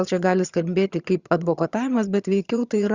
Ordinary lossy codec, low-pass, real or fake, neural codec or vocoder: Opus, 64 kbps; 7.2 kHz; fake; vocoder, 22.05 kHz, 80 mel bands, HiFi-GAN